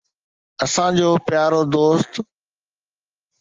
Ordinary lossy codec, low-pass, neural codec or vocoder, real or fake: Opus, 24 kbps; 7.2 kHz; none; real